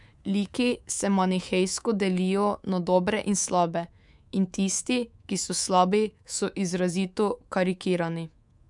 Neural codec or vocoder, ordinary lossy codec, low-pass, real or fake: codec, 24 kHz, 3.1 kbps, DualCodec; none; 10.8 kHz; fake